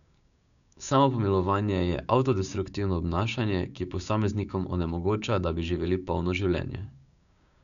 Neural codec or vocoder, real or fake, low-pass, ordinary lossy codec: codec, 16 kHz, 6 kbps, DAC; fake; 7.2 kHz; none